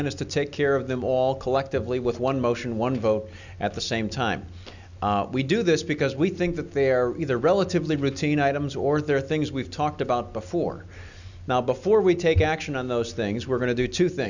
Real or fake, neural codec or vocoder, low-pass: real; none; 7.2 kHz